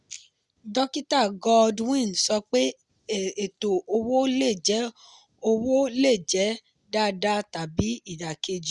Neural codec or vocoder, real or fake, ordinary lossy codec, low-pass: none; real; Opus, 64 kbps; 10.8 kHz